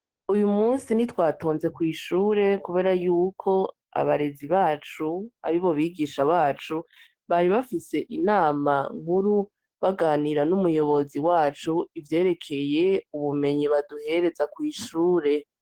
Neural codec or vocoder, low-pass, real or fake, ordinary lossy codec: codec, 44.1 kHz, 7.8 kbps, DAC; 19.8 kHz; fake; Opus, 16 kbps